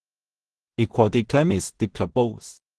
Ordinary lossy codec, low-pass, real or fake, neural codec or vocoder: Opus, 16 kbps; 10.8 kHz; fake; codec, 16 kHz in and 24 kHz out, 0.4 kbps, LongCat-Audio-Codec, two codebook decoder